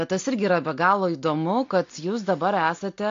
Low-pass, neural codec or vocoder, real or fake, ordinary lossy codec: 7.2 kHz; none; real; AAC, 48 kbps